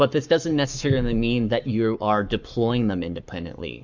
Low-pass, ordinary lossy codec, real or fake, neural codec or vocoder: 7.2 kHz; MP3, 64 kbps; fake; codec, 44.1 kHz, 7.8 kbps, Pupu-Codec